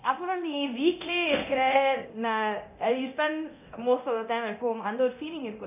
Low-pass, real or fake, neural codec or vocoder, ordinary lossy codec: 3.6 kHz; fake; codec, 24 kHz, 0.9 kbps, DualCodec; none